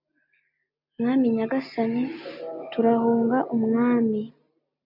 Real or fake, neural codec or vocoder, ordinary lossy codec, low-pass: real; none; MP3, 48 kbps; 5.4 kHz